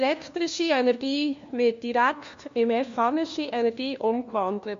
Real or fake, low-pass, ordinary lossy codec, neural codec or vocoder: fake; 7.2 kHz; MP3, 48 kbps; codec, 16 kHz, 1 kbps, FunCodec, trained on LibriTTS, 50 frames a second